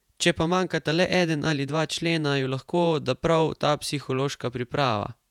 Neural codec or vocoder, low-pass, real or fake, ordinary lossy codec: vocoder, 48 kHz, 128 mel bands, Vocos; 19.8 kHz; fake; none